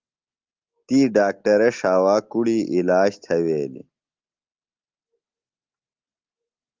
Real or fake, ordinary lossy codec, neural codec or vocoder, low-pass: real; Opus, 32 kbps; none; 7.2 kHz